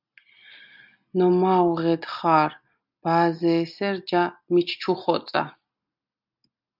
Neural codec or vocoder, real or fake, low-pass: none; real; 5.4 kHz